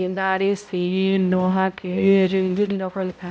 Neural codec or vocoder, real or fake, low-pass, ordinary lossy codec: codec, 16 kHz, 0.5 kbps, X-Codec, HuBERT features, trained on balanced general audio; fake; none; none